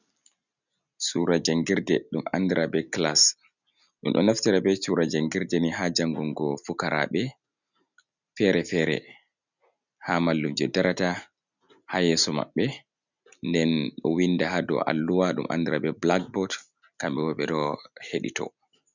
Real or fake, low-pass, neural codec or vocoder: real; 7.2 kHz; none